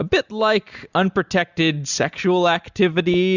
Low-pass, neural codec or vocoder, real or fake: 7.2 kHz; none; real